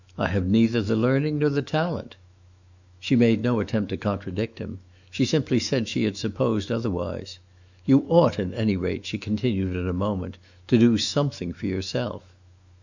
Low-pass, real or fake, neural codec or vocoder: 7.2 kHz; fake; autoencoder, 48 kHz, 128 numbers a frame, DAC-VAE, trained on Japanese speech